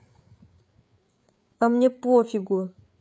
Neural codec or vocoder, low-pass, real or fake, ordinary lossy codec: codec, 16 kHz, 8 kbps, FreqCodec, larger model; none; fake; none